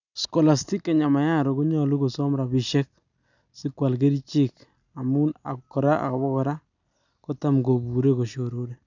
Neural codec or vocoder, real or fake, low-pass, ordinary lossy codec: none; real; 7.2 kHz; none